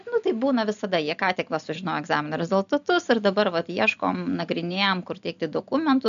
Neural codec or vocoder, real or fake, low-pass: none; real; 7.2 kHz